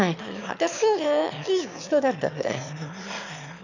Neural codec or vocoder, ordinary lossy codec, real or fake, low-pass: autoencoder, 22.05 kHz, a latent of 192 numbers a frame, VITS, trained on one speaker; none; fake; 7.2 kHz